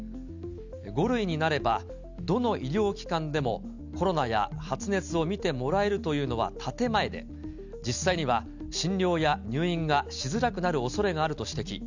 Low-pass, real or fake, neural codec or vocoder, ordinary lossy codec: 7.2 kHz; real; none; none